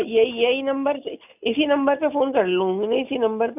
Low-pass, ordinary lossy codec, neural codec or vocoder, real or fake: 3.6 kHz; none; none; real